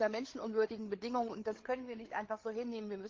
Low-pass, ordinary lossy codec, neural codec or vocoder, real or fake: 7.2 kHz; Opus, 16 kbps; codec, 16 kHz, 8 kbps, FreqCodec, larger model; fake